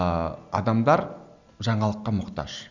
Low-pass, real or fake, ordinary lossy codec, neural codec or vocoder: 7.2 kHz; real; none; none